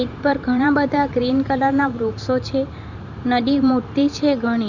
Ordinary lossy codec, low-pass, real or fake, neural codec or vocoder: none; 7.2 kHz; fake; vocoder, 44.1 kHz, 128 mel bands every 512 samples, BigVGAN v2